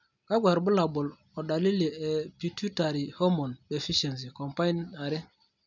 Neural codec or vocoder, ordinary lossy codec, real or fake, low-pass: none; none; real; 7.2 kHz